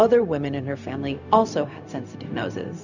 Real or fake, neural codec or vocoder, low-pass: fake; codec, 16 kHz, 0.4 kbps, LongCat-Audio-Codec; 7.2 kHz